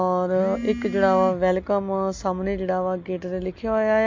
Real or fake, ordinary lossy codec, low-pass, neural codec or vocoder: real; MP3, 48 kbps; 7.2 kHz; none